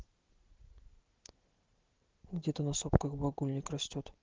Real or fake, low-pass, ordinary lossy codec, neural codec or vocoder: real; 7.2 kHz; Opus, 16 kbps; none